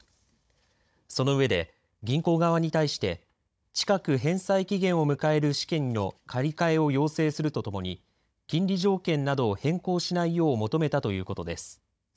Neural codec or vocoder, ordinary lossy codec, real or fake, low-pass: codec, 16 kHz, 16 kbps, FunCodec, trained on Chinese and English, 50 frames a second; none; fake; none